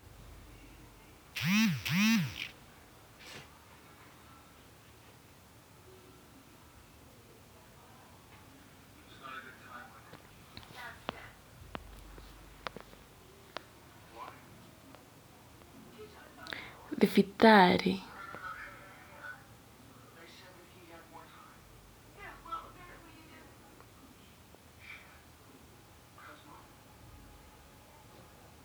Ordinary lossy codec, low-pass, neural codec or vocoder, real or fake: none; none; none; real